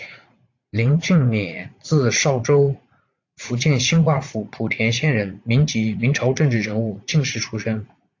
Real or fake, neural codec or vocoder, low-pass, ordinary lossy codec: fake; vocoder, 44.1 kHz, 80 mel bands, Vocos; 7.2 kHz; AAC, 48 kbps